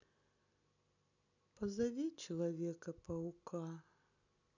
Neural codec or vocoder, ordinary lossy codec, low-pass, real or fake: none; none; 7.2 kHz; real